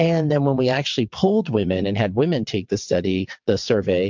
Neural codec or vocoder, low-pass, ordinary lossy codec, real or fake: vocoder, 22.05 kHz, 80 mel bands, WaveNeXt; 7.2 kHz; MP3, 64 kbps; fake